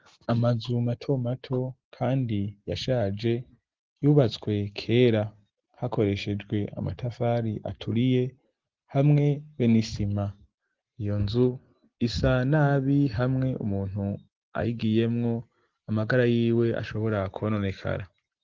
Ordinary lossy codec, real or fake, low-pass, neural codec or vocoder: Opus, 16 kbps; real; 7.2 kHz; none